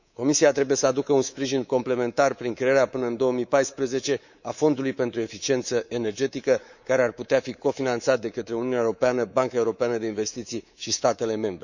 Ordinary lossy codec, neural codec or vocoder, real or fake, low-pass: none; codec, 24 kHz, 3.1 kbps, DualCodec; fake; 7.2 kHz